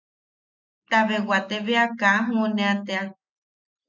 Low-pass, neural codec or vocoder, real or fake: 7.2 kHz; none; real